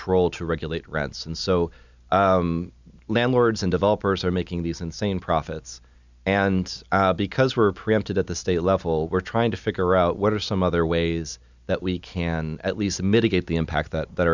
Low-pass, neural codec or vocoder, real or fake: 7.2 kHz; none; real